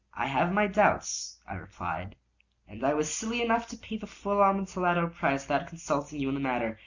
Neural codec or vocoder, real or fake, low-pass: none; real; 7.2 kHz